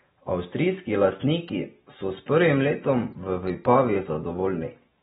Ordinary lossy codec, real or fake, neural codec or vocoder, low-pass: AAC, 16 kbps; real; none; 19.8 kHz